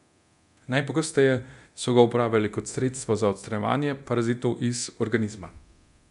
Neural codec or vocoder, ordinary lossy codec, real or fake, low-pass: codec, 24 kHz, 0.9 kbps, DualCodec; none; fake; 10.8 kHz